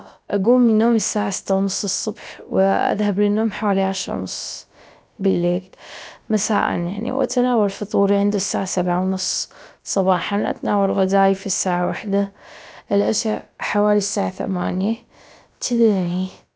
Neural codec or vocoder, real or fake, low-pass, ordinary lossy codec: codec, 16 kHz, about 1 kbps, DyCAST, with the encoder's durations; fake; none; none